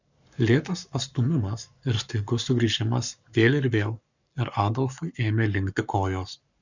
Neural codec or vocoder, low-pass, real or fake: codec, 44.1 kHz, 7.8 kbps, Pupu-Codec; 7.2 kHz; fake